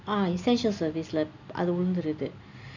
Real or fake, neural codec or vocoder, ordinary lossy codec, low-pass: real; none; none; 7.2 kHz